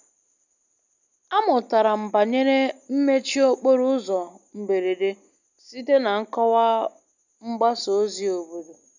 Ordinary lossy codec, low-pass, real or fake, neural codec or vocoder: none; 7.2 kHz; real; none